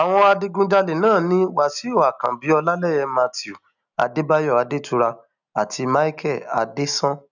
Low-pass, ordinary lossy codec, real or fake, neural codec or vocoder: 7.2 kHz; none; real; none